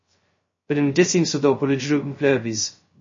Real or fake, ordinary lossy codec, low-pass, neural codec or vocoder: fake; MP3, 32 kbps; 7.2 kHz; codec, 16 kHz, 0.2 kbps, FocalCodec